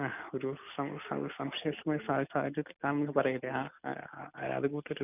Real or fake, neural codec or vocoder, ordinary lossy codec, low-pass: real; none; none; 3.6 kHz